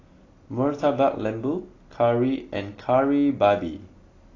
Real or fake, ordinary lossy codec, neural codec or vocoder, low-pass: real; AAC, 32 kbps; none; 7.2 kHz